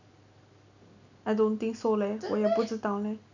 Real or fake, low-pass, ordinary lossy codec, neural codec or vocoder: real; 7.2 kHz; none; none